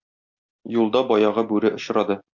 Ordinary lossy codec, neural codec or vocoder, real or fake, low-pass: MP3, 64 kbps; none; real; 7.2 kHz